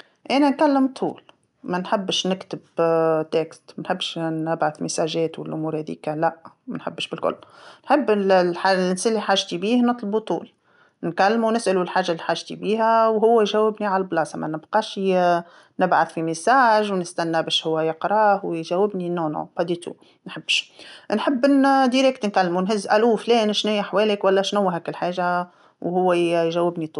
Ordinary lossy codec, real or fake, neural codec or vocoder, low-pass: none; real; none; 10.8 kHz